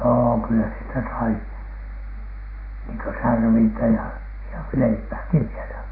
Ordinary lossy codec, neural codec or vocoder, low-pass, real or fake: AAC, 24 kbps; none; 5.4 kHz; real